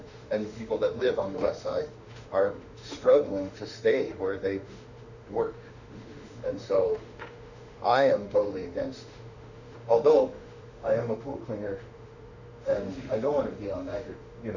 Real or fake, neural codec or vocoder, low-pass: fake; autoencoder, 48 kHz, 32 numbers a frame, DAC-VAE, trained on Japanese speech; 7.2 kHz